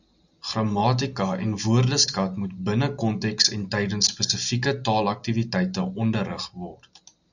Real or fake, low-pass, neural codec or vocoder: real; 7.2 kHz; none